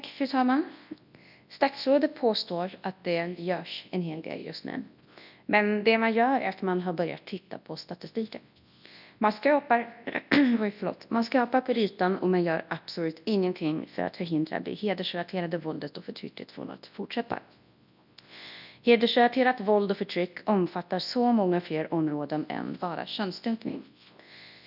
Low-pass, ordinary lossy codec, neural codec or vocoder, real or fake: 5.4 kHz; none; codec, 24 kHz, 0.9 kbps, WavTokenizer, large speech release; fake